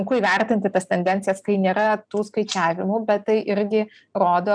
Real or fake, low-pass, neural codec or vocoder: real; 9.9 kHz; none